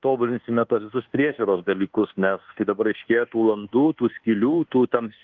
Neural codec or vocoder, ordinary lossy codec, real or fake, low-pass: codec, 24 kHz, 1.2 kbps, DualCodec; Opus, 16 kbps; fake; 7.2 kHz